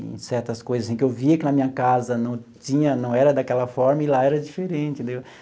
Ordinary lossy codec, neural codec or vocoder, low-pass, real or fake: none; none; none; real